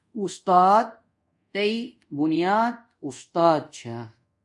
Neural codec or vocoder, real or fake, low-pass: codec, 24 kHz, 0.5 kbps, DualCodec; fake; 10.8 kHz